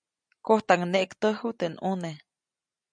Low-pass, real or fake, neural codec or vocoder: 9.9 kHz; real; none